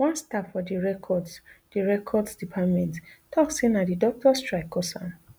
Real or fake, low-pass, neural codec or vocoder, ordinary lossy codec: real; none; none; none